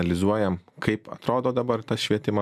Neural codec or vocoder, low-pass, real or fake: none; 14.4 kHz; real